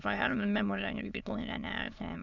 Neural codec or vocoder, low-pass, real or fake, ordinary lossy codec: autoencoder, 22.05 kHz, a latent of 192 numbers a frame, VITS, trained on many speakers; 7.2 kHz; fake; Opus, 64 kbps